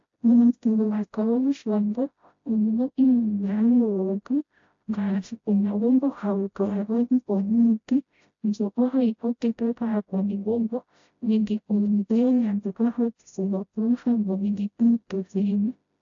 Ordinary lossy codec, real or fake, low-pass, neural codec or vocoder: AAC, 48 kbps; fake; 7.2 kHz; codec, 16 kHz, 0.5 kbps, FreqCodec, smaller model